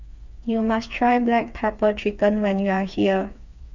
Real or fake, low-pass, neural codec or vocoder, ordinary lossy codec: fake; 7.2 kHz; codec, 16 kHz, 4 kbps, FreqCodec, smaller model; none